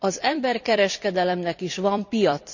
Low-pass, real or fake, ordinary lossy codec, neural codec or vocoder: 7.2 kHz; fake; none; vocoder, 44.1 kHz, 128 mel bands every 256 samples, BigVGAN v2